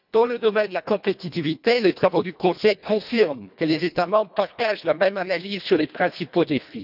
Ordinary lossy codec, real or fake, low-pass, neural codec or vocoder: none; fake; 5.4 kHz; codec, 24 kHz, 1.5 kbps, HILCodec